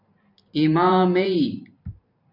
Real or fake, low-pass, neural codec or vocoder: fake; 5.4 kHz; vocoder, 44.1 kHz, 128 mel bands every 512 samples, BigVGAN v2